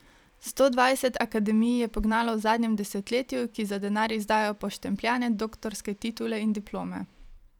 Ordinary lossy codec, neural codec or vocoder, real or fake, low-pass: none; vocoder, 44.1 kHz, 128 mel bands every 512 samples, BigVGAN v2; fake; 19.8 kHz